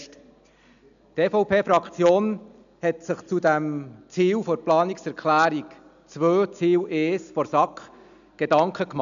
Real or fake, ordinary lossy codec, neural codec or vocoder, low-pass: real; MP3, 96 kbps; none; 7.2 kHz